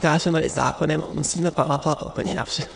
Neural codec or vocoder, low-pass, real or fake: autoencoder, 22.05 kHz, a latent of 192 numbers a frame, VITS, trained on many speakers; 9.9 kHz; fake